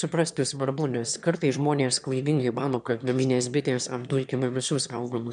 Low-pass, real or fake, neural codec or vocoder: 9.9 kHz; fake; autoencoder, 22.05 kHz, a latent of 192 numbers a frame, VITS, trained on one speaker